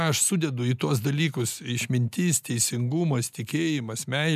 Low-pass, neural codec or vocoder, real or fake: 14.4 kHz; none; real